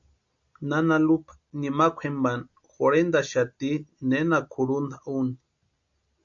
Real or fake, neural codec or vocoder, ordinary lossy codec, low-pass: real; none; AAC, 64 kbps; 7.2 kHz